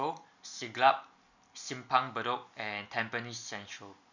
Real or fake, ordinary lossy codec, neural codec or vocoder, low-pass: real; none; none; 7.2 kHz